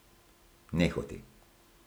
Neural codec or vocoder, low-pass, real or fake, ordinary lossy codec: none; none; real; none